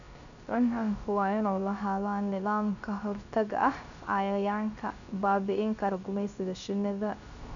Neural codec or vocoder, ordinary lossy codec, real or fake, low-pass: codec, 16 kHz, 0.3 kbps, FocalCodec; none; fake; 7.2 kHz